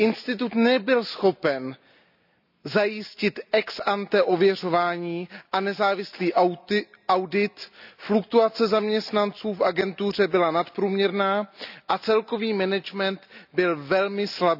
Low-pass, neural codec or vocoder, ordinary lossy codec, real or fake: 5.4 kHz; none; none; real